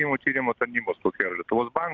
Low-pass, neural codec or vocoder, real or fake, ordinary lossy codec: 7.2 kHz; none; real; Opus, 64 kbps